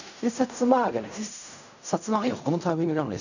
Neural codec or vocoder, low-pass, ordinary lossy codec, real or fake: codec, 16 kHz in and 24 kHz out, 0.4 kbps, LongCat-Audio-Codec, fine tuned four codebook decoder; 7.2 kHz; none; fake